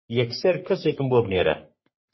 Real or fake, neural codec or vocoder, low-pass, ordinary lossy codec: fake; codec, 44.1 kHz, 3.4 kbps, Pupu-Codec; 7.2 kHz; MP3, 24 kbps